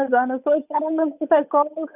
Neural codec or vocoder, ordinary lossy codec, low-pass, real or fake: codec, 16 kHz, 8 kbps, FunCodec, trained on Chinese and English, 25 frames a second; none; 3.6 kHz; fake